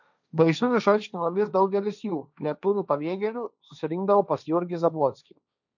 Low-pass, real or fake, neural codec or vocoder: 7.2 kHz; fake; codec, 16 kHz, 1.1 kbps, Voila-Tokenizer